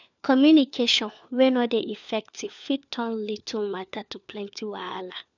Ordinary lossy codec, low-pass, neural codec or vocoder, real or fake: none; 7.2 kHz; codec, 16 kHz, 4 kbps, FunCodec, trained on LibriTTS, 50 frames a second; fake